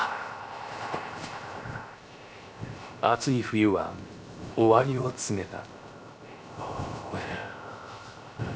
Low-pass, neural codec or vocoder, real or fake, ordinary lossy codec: none; codec, 16 kHz, 0.3 kbps, FocalCodec; fake; none